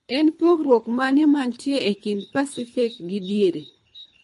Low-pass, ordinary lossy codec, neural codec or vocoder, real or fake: 10.8 kHz; MP3, 48 kbps; codec, 24 kHz, 3 kbps, HILCodec; fake